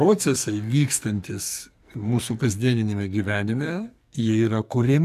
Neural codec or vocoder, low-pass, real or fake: codec, 44.1 kHz, 2.6 kbps, SNAC; 14.4 kHz; fake